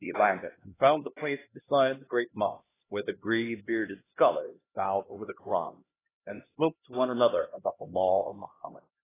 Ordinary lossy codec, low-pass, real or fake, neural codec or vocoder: AAC, 16 kbps; 3.6 kHz; fake; codec, 16 kHz, 1 kbps, X-Codec, HuBERT features, trained on LibriSpeech